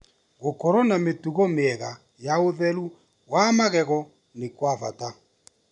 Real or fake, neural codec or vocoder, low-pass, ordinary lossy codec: real; none; 10.8 kHz; none